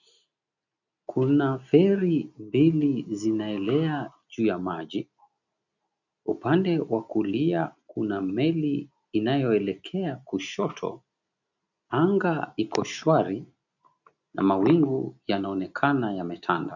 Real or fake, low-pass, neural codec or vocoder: real; 7.2 kHz; none